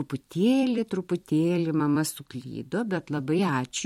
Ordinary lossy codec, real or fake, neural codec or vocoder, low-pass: MP3, 64 kbps; fake; vocoder, 44.1 kHz, 128 mel bands every 256 samples, BigVGAN v2; 19.8 kHz